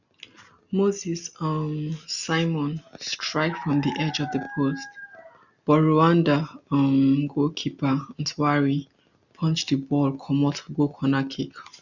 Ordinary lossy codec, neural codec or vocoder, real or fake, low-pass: none; none; real; 7.2 kHz